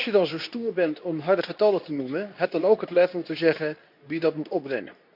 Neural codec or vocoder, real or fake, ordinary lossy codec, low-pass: codec, 24 kHz, 0.9 kbps, WavTokenizer, medium speech release version 2; fake; none; 5.4 kHz